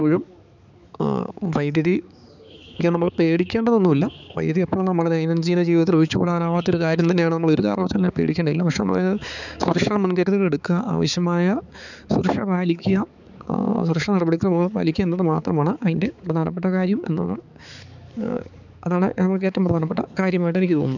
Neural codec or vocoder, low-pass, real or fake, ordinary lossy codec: codec, 16 kHz, 4 kbps, X-Codec, HuBERT features, trained on balanced general audio; 7.2 kHz; fake; none